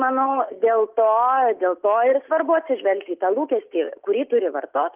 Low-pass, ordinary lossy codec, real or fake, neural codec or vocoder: 3.6 kHz; Opus, 32 kbps; real; none